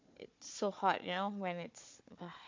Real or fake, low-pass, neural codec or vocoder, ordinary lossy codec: fake; 7.2 kHz; codec, 16 kHz, 4 kbps, FunCodec, trained on Chinese and English, 50 frames a second; MP3, 48 kbps